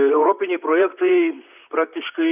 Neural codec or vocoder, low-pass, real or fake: vocoder, 44.1 kHz, 128 mel bands, Pupu-Vocoder; 3.6 kHz; fake